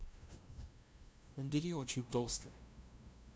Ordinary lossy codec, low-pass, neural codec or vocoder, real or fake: none; none; codec, 16 kHz, 0.5 kbps, FunCodec, trained on LibriTTS, 25 frames a second; fake